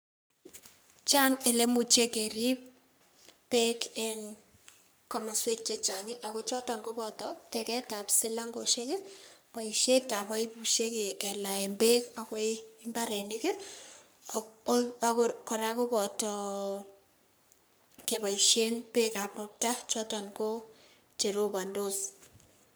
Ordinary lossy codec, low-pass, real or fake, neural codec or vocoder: none; none; fake; codec, 44.1 kHz, 3.4 kbps, Pupu-Codec